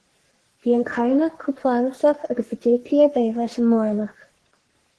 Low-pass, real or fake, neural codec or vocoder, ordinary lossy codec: 10.8 kHz; fake; codec, 44.1 kHz, 3.4 kbps, Pupu-Codec; Opus, 16 kbps